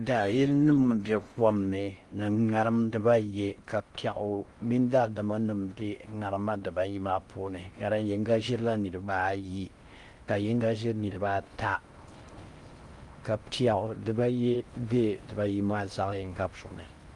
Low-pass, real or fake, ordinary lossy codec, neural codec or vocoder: 10.8 kHz; fake; Opus, 32 kbps; codec, 16 kHz in and 24 kHz out, 0.6 kbps, FocalCodec, streaming, 2048 codes